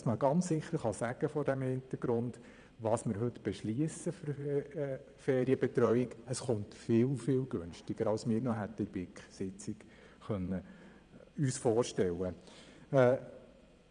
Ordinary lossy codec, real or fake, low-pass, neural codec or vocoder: none; fake; 9.9 kHz; vocoder, 22.05 kHz, 80 mel bands, Vocos